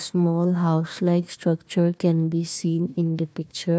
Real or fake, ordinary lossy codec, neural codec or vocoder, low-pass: fake; none; codec, 16 kHz, 1 kbps, FunCodec, trained on Chinese and English, 50 frames a second; none